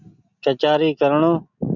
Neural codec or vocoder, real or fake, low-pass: none; real; 7.2 kHz